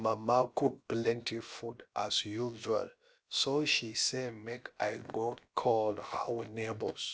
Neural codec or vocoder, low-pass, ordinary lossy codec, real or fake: codec, 16 kHz, about 1 kbps, DyCAST, with the encoder's durations; none; none; fake